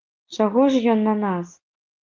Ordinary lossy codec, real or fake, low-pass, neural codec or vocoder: Opus, 32 kbps; real; 7.2 kHz; none